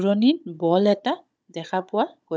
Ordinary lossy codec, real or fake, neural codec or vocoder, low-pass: none; fake; codec, 16 kHz, 16 kbps, FunCodec, trained on Chinese and English, 50 frames a second; none